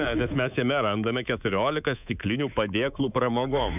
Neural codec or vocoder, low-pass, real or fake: codec, 24 kHz, 3.1 kbps, DualCodec; 3.6 kHz; fake